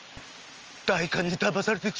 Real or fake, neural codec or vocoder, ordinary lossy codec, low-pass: real; none; Opus, 24 kbps; 7.2 kHz